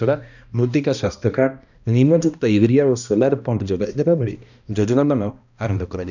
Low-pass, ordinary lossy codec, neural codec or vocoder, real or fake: 7.2 kHz; none; codec, 16 kHz, 1 kbps, X-Codec, HuBERT features, trained on balanced general audio; fake